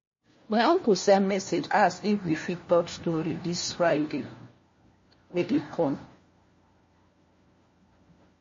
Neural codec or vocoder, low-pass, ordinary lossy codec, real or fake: codec, 16 kHz, 1 kbps, FunCodec, trained on LibriTTS, 50 frames a second; 7.2 kHz; MP3, 32 kbps; fake